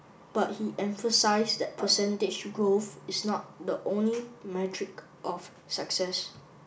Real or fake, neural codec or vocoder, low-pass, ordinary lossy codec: real; none; none; none